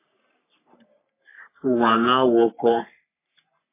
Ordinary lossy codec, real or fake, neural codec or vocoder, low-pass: MP3, 24 kbps; fake; codec, 44.1 kHz, 3.4 kbps, Pupu-Codec; 3.6 kHz